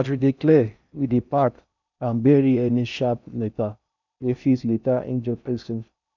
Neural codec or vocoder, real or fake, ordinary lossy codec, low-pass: codec, 16 kHz in and 24 kHz out, 0.6 kbps, FocalCodec, streaming, 4096 codes; fake; none; 7.2 kHz